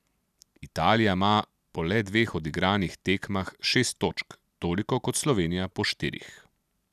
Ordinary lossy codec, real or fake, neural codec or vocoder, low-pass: none; real; none; 14.4 kHz